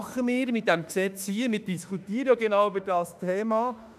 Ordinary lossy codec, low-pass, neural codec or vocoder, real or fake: none; 14.4 kHz; autoencoder, 48 kHz, 32 numbers a frame, DAC-VAE, trained on Japanese speech; fake